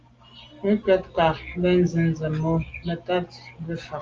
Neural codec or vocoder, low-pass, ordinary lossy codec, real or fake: none; 7.2 kHz; Opus, 32 kbps; real